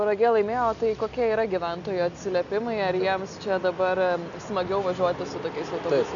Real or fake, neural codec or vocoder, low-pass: real; none; 7.2 kHz